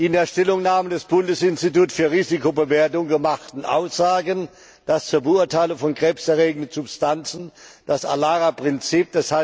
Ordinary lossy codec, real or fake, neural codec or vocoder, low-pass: none; real; none; none